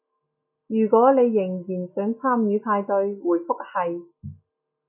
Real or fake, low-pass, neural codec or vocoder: real; 3.6 kHz; none